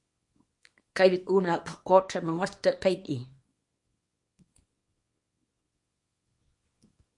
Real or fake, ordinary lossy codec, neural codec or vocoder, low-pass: fake; MP3, 48 kbps; codec, 24 kHz, 0.9 kbps, WavTokenizer, small release; 10.8 kHz